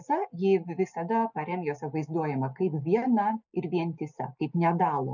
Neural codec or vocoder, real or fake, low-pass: none; real; 7.2 kHz